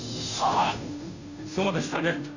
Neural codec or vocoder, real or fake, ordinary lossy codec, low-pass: codec, 16 kHz, 0.5 kbps, FunCodec, trained on Chinese and English, 25 frames a second; fake; none; 7.2 kHz